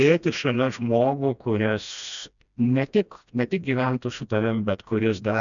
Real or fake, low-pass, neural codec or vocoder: fake; 7.2 kHz; codec, 16 kHz, 1 kbps, FreqCodec, smaller model